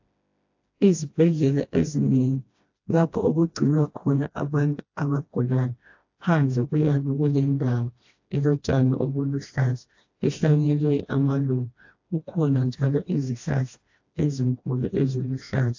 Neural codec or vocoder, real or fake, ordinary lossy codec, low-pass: codec, 16 kHz, 1 kbps, FreqCodec, smaller model; fake; AAC, 48 kbps; 7.2 kHz